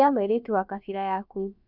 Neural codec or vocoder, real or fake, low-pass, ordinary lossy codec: codec, 16 kHz, about 1 kbps, DyCAST, with the encoder's durations; fake; 5.4 kHz; none